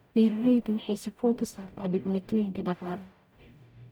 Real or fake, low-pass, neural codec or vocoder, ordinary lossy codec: fake; none; codec, 44.1 kHz, 0.9 kbps, DAC; none